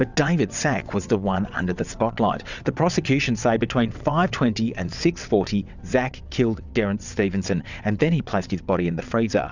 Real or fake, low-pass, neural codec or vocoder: fake; 7.2 kHz; vocoder, 22.05 kHz, 80 mel bands, WaveNeXt